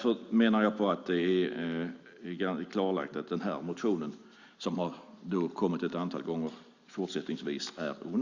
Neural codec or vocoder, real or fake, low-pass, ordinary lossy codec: none; real; 7.2 kHz; Opus, 64 kbps